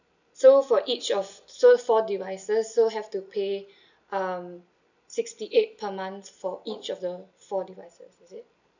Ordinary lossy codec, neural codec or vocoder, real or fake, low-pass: none; none; real; 7.2 kHz